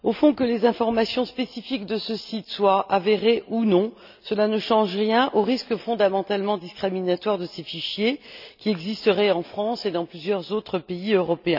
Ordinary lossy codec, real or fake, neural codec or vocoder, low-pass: none; real; none; 5.4 kHz